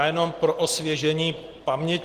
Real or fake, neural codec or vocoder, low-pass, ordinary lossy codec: real; none; 14.4 kHz; Opus, 16 kbps